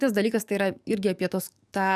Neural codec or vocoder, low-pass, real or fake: codec, 44.1 kHz, 7.8 kbps, DAC; 14.4 kHz; fake